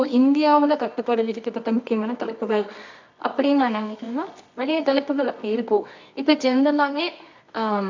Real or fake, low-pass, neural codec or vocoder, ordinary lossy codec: fake; 7.2 kHz; codec, 24 kHz, 0.9 kbps, WavTokenizer, medium music audio release; MP3, 64 kbps